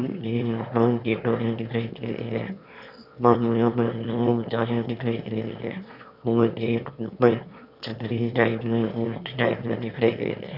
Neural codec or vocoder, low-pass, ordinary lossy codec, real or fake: autoencoder, 22.05 kHz, a latent of 192 numbers a frame, VITS, trained on one speaker; 5.4 kHz; none; fake